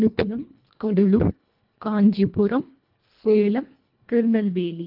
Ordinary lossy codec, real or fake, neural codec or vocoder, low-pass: Opus, 32 kbps; fake; codec, 24 kHz, 1.5 kbps, HILCodec; 5.4 kHz